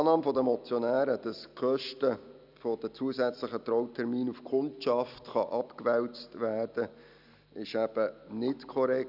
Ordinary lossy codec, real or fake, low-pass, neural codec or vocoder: none; real; 5.4 kHz; none